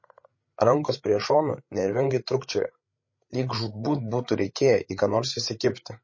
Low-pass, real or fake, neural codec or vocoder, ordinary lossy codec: 7.2 kHz; fake; codec, 16 kHz, 8 kbps, FreqCodec, larger model; MP3, 32 kbps